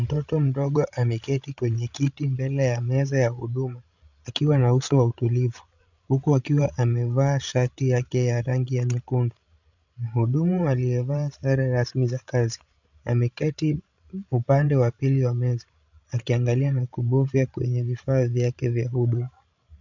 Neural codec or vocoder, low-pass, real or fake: codec, 16 kHz, 16 kbps, FreqCodec, larger model; 7.2 kHz; fake